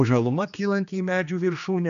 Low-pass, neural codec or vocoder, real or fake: 7.2 kHz; codec, 16 kHz, 2 kbps, X-Codec, HuBERT features, trained on general audio; fake